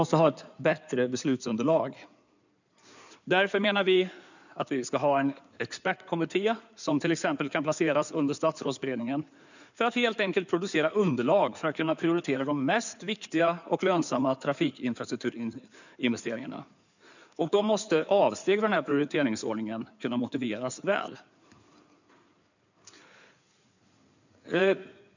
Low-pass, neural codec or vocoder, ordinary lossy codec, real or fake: 7.2 kHz; codec, 16 kHz in and 24 kHz out, 2.2 kbps, FireRedTTS-2 codec; none; fake